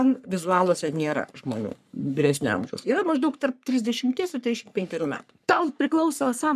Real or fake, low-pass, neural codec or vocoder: fake; 14.4 kHz; codec, 44.1 kHz, 3.4 kbps, Pupu-Codec